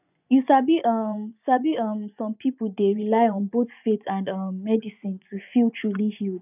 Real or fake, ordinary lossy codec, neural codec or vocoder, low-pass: fake; none; vocoder, 24 kHz, 100 mel bands, Vocos; 3.6 kHz